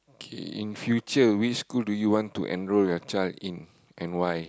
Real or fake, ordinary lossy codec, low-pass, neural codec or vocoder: real; none; none; none